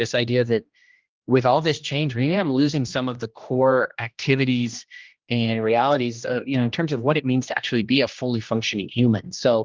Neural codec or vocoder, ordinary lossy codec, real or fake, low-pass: codec, 16 kHz, 1 kbps, X-Codec, HuBERT features, trained on general audio; Opus, 32 kbps; fake; 7.2 kHz